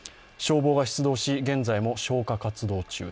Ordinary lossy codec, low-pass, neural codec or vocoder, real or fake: none; none; none; real